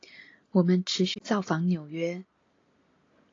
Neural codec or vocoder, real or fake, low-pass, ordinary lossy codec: none; real; 7.2 kHz; AAC, 32 kbps